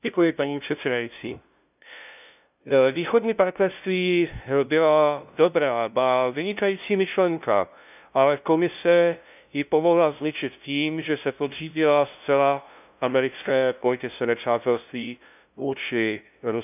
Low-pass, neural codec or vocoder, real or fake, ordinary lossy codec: 3.6 kHz; codec, 16 kHz, 0.5 kbps, FunCodec, trained on LibriTTS, 25 frames a second; fake; none